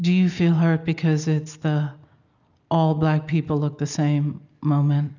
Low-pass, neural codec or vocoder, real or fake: 7.2 kHz; none; real